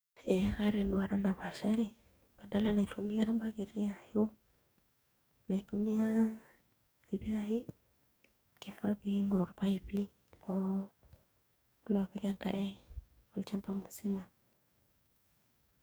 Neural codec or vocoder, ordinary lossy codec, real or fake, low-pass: codec, 44.1 kHz, 2.6 kbps, DAC; none; fake; none